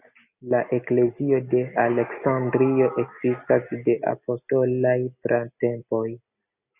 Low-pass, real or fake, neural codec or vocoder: 3.6 kHz; real; none